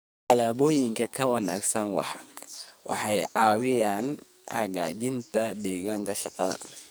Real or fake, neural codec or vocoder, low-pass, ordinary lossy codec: fake; codec, 44.1 kHz, 2.6 kbps, SNAC; none; none